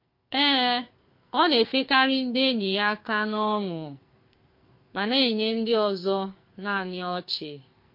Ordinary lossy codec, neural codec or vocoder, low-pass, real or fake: MP3, 32 kbps; codec, 44.1 kHz, 2.6 kbps, SNAC; 5.4 kHz; fake